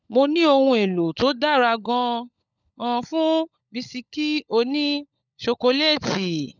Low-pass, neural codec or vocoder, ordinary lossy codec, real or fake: 7.2 kHz; codec, 16 kHz, 16 kbps, FunCodec, trained on LibriTTS, 50 frames a second; none; fake